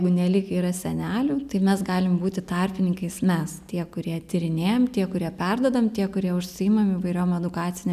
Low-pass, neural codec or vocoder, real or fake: 14.4 kHz; none; real